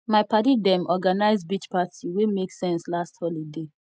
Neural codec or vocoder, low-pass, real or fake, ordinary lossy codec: none; none; real; none